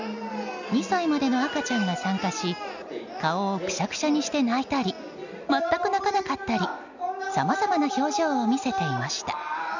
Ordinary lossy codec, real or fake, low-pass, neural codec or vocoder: none; real; 7.2 kHz; none